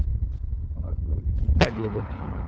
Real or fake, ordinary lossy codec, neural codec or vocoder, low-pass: fake; none; codec, 16 kHz, 4 kbps, FunCodec, trained on LibriTTS, 50 frames a second; none